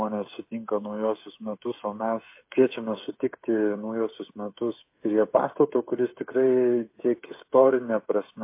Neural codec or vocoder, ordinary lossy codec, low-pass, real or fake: codec, 16 kHz, 8 kbps, FreqCodec, smaller model; MP3, 24 kbps; 3.6 kHz; fake